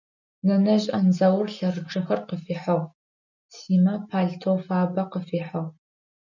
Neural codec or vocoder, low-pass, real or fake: none; 7.2 kHz; real